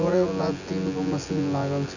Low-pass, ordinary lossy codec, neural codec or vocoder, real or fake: 7.2 kHz; none; vocoder, 24 kHz, 100 mel bands, Vocos; fake